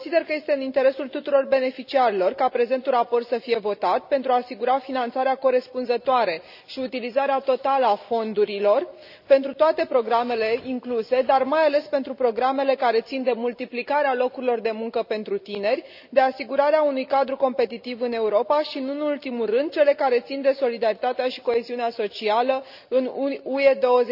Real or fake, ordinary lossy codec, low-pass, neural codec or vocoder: real; none; 5.4 kHz; none